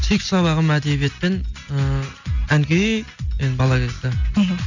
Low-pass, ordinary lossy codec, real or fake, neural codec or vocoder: 7.2 kHz; none; real; none